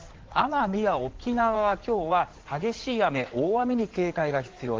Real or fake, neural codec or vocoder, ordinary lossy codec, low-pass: fake; codec, 16 kHz in and 24 kHz out, 2.2 kbps, FireRedTTS-2 codec; Opus, 16 kbps; 7.2 kHz